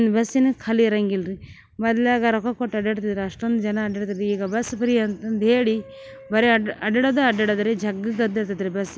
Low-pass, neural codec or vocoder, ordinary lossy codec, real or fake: none; none; none; real